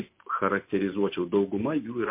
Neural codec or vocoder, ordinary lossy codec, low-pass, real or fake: none; MP3, 24 kbps; 3.6 kHz; real